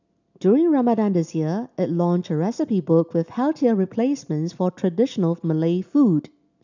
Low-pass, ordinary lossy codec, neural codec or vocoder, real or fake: 7.2 kHz; none; none; real